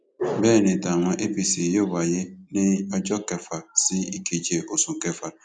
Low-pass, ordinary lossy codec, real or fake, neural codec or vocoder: 9.9 kHz; none; real; none